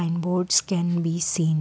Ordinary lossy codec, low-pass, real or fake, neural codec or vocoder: none; none; real; none